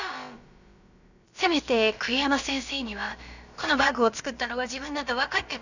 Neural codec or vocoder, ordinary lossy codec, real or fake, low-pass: codec, 16 kHz, about 1 kbps, DyCAST, with the encoder's durations; none; fake; 7.2 kHz